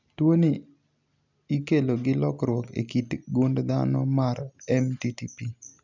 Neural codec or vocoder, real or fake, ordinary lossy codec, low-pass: none; real; none; 7.2 kHz